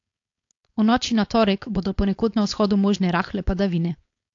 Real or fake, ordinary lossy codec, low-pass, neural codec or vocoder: fake; AAC, 48 kbps; 7.2 kHz; codec, 16 kHz, 4.8 kbps, FACodec